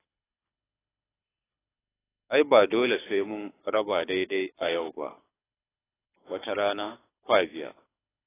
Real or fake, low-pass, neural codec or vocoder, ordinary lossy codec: fake; 3.6 kHz; codec, 24 kHz, 6 kbps, HILCodec; AAC, 16 kbps